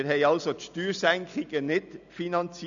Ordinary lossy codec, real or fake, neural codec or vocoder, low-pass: none; real; none; 7.2 kHz